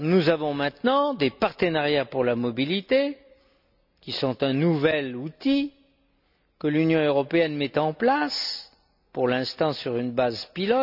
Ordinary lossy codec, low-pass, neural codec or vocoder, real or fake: none; 5.4 kHz; none; real